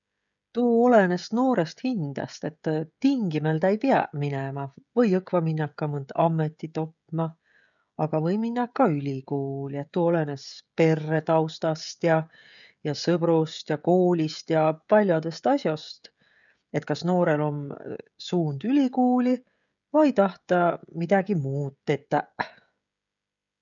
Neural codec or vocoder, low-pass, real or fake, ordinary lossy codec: codec, 16 kHz, 16 kbps, FreqCodec, smaller model; 7.2 kHz; fake; none